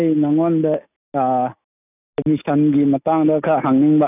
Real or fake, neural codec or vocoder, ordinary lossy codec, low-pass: real; none; none; 3.6 kHz